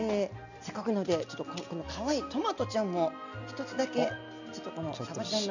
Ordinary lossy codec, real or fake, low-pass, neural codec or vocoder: none; real; 7.2 kHz; none